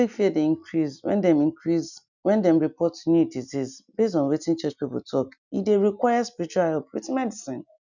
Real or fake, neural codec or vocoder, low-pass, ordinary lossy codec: real; none; 7.2 kHz; none